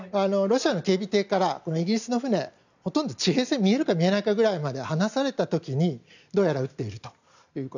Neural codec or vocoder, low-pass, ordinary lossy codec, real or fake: none; 7.2 kHz; none; real